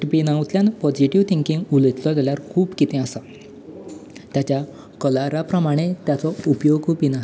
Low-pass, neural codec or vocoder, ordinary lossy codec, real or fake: none; none; none; real